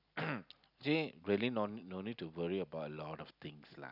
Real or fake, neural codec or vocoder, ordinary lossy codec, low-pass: real; none; none; 5.4 kHz